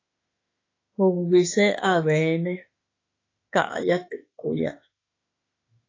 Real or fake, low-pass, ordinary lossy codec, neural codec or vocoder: fake; 7.2 kHz; AAC, 32 kbps; autoencoder, 48 kHz, 32 numbers a frame, DAC-VAE, trained on Japanese speech